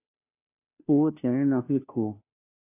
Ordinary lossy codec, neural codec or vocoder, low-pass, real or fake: AAC, 32 kbps; codec, 16 kHz, 0.5 kbps, FunCodec, trained on Chinese and English, 25 frames a second; 3.6 kHz; fake